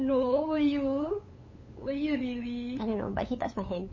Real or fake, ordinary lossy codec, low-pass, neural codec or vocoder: fake; MP3, 32 kbps; 7.2 kHz; codec, 16 kHz, 8 kbps, FunCodec, trained on LibriTTS, 25 frames a second